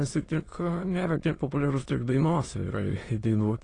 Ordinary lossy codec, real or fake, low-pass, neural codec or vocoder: AAC, 32 kbps; fake; 9.9 kHz; autoencoder, 22.05 kHz, a latent of 192 numbers a frame, VITS, trained on many speakers